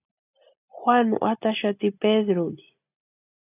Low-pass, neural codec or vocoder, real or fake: 3.6 kHz; none; real